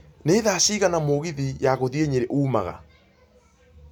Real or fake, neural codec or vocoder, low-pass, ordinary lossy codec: real; none; none; none